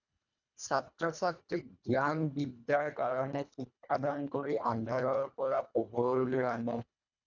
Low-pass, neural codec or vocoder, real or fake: 7.2 kHz; codec, 24 kHz, 1.5 kbps, HILCodec; fake